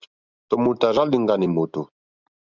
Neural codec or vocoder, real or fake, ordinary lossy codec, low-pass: none; real; Opus, 64 kbps; 7.2 kHz